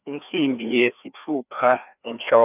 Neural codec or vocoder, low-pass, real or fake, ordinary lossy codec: codec, 16 kHz, 2 kbps, FreqCodec, larger model; 3.6 kHz; fake; none